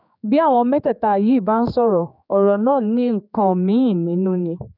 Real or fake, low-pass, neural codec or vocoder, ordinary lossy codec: fake; 5.4 kHz; codec, 16 kHz, 4 kbps, X-Codec, HuBERT features, trained on general audio; none